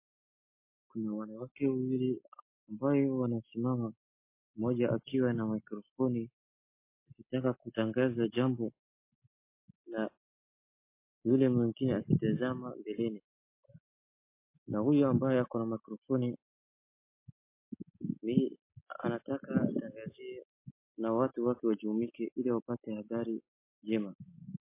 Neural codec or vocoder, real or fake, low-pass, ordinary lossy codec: none; real; 3.6 kHz; MP3, 24 kbps